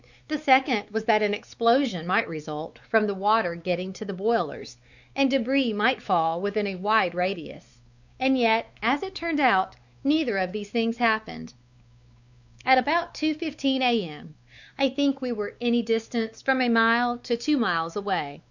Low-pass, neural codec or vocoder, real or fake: 7.2 kHz; codec, 16 kHz, 4 kbps, X-Codec, WavLM features, trained on Multilingual LibriSpeech; fake